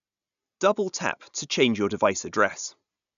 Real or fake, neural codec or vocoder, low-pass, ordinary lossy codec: real; none; 7.2 kHz; none